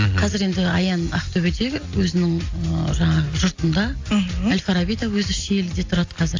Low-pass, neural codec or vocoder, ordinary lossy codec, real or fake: 7.2 kHz; none; AAC, 48 kbps; real